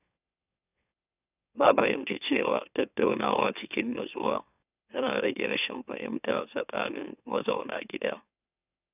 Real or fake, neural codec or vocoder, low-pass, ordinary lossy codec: fake; autoencoder, 44.1 kHz, a latent of 192 numbers a frame, MeloTTS; 3.6 kHz; none